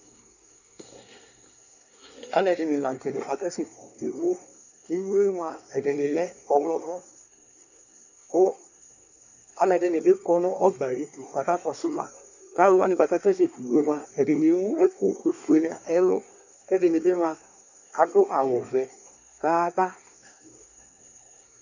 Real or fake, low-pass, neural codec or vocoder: fake; 7.2 kHz; codec, 24 kHz, 1 kbps, SNAC